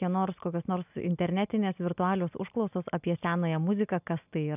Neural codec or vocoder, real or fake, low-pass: none; real; 3.6 kHz